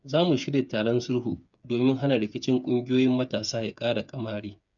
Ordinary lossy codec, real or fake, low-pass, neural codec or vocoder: none; fake; 7.2 kHz; codec, 16 kHz, 8 kbps, FreqCodec, smaller model